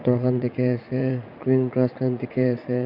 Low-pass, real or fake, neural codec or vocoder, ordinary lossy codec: 5.4 kHz; fake; vocoder, 44.1 kHz, 80 mel bands, Vocos; Opus, 64 kbps